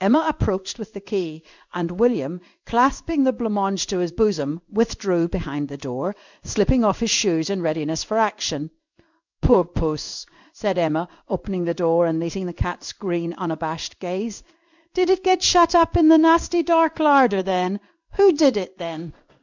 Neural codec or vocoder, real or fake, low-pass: codec, 16 kHz in and 24 kHz out, 1 kbps, XY-Tokenizer; fake; 7.2 kHz